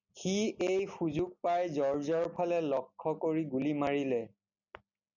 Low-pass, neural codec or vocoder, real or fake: 7.2 kHz; none; real